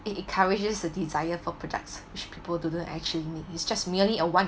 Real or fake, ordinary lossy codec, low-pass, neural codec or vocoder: real; none; none; none